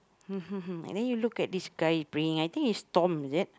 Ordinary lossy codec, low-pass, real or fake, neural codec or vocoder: none; none; real; none